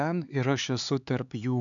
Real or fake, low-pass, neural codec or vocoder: fake; 7.2 kHz; codec, 16 kHz, 4 kbps, X-Codec, HuBERT features, trained on LibriSpeech